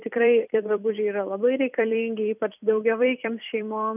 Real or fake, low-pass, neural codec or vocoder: fake; 3.6 kHz; vocoder, 44.1 kHz, 128 mel bands every 256 samples, BigVGAN v2